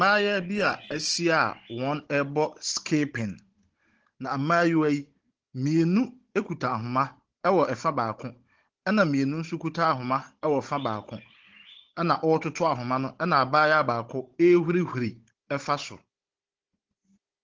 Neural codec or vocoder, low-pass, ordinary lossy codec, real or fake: codec, 16 kHz, 16 kbps, FunCodec, trained on Chinese and English, 50 frames a second; 7.2 kHz; Opus, 16 kbps; fake